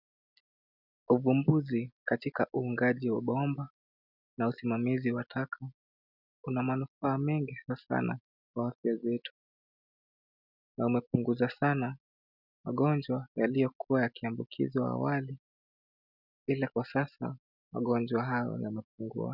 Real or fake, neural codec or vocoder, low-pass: real; none; 5.4 kHz